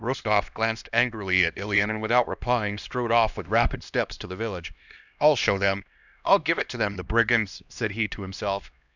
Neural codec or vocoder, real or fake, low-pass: codec, 16 kHz, 1 kbps, X-Codec, HuBERT features, trained on LibriSpeech; fake; 7.2 kHz